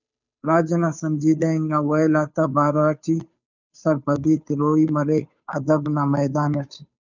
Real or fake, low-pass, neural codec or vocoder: fake; 7.2 kHz; codec, 16 kHz, 2 kbps, FunCodec, trained on Chinese and English, 25 frames a second